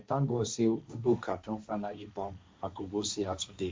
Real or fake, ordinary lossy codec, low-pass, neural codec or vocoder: fake; none; none; codec, 16 kHz, 1.1 kbps, Voila-Tokenizer